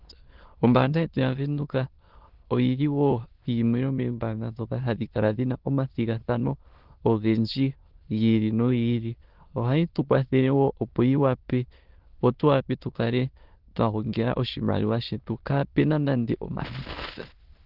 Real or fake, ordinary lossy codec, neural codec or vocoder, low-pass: fake; Opus, 16 kbps; autoencoder, 22.05 kHz, a latent of 192 numbers a frame, VITS, trained on many speakers; 5.4 kHz